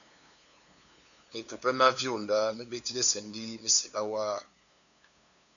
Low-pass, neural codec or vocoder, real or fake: 7.2 kHz; codec, 16 kHz, 2 kbps, FunCodec, trained on LibriTTS, 25 frames a second; fake